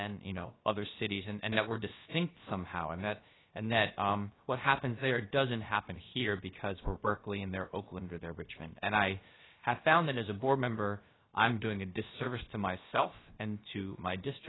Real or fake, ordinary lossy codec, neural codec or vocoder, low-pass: fake; AAC, 16 kbps; codec, 16 kHz, about 1 kbps, DyCAST, with the encoder's durations; 7.2 kHz